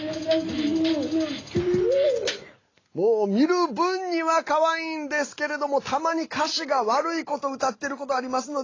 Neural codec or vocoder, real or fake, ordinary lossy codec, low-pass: none; real; AAC, 32 kbps; 7.2 kHz